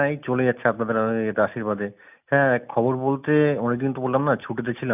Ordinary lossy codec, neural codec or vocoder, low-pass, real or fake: none; none; 3.6 kHz; real